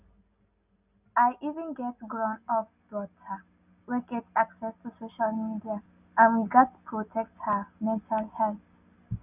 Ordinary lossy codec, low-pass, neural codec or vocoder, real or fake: none; 3.6 kHz; none; real